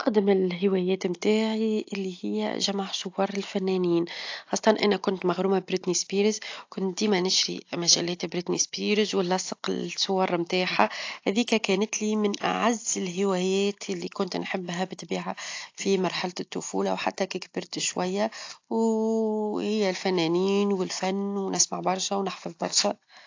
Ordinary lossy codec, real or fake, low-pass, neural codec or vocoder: AAC, 48 kbps; real; 7.2 kHz; none